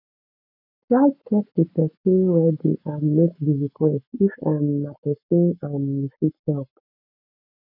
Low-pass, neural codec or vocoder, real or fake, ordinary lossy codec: 5.4 kHz; codec, 44.1 kHz, 7.8 kbps, Pupu-Codec; fake; none